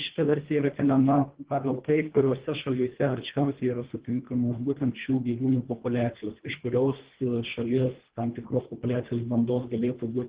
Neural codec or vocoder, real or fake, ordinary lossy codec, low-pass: codec, 24 kHz, 1.5 kbps, HILCodec; fake; Opus, 16 kbps; 3.6 kHz